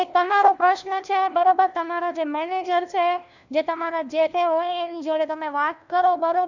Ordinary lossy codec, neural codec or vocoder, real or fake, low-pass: none; codec, 16 kHz, 1 kbps, FunCodec, trained on LibriTTS, 50 frames a second; fake; 7.2 kHz